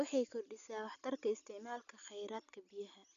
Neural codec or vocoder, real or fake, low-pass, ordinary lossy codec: none; real; 7.2 kHz; none